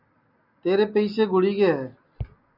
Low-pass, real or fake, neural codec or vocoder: 5.4 kHz; real; none